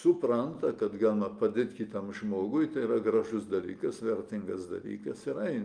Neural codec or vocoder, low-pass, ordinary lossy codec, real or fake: none; 9.9 kHz; Opus, 32 kbps; real